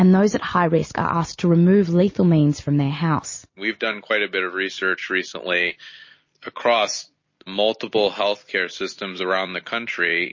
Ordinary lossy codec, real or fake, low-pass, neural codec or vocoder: MP3, 32 kbps; real; 7.2 kHz; none